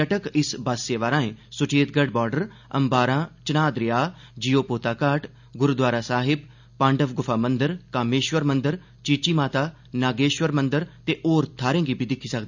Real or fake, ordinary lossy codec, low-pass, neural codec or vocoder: real; none; 7.2 kHz; none